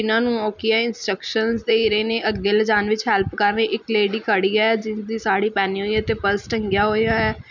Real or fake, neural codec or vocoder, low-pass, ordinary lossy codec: real; none; 7.2 kHz; none